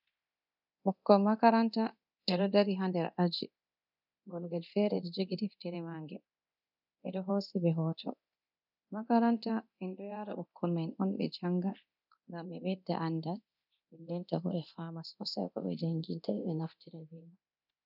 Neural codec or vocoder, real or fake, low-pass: codec, 24 kHz, 0.9 kbps, DualCodec; fake; 5.4 kHz